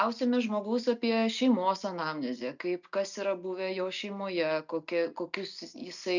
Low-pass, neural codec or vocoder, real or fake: 7.2 kHz; none; real